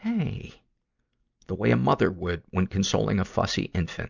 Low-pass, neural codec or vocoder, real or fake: 7.2 kHz; none; real